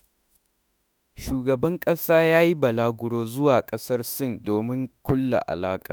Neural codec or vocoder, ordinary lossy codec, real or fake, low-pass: autoencoder, 48 kHz, 32 numbers a frame, DAC-VAE, trained on Japanese speech; none; fake; none